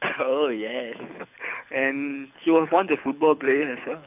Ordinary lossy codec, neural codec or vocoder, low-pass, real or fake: none; codec, 24 kHz, 6 kbps, HILCodec; 3.6 kHz; fake